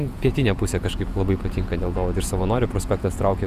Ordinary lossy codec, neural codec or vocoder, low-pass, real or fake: Opus, 64 kbps; vocoder, 48 kHz, 128 mel bands, Vocos; 14.4 kHz; fake